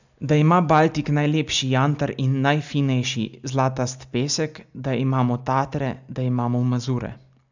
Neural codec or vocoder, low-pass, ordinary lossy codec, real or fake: none; 7.2 kHz; none; real